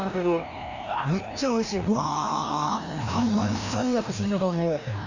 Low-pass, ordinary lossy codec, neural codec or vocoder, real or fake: 7.2 kHz; none; codec, 16 kHz, 1 kbps, FreqCodec, larger model; fake